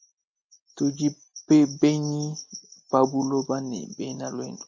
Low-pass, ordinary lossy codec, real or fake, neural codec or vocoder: 7.2 kHz; MP3, 64 kbps; real; none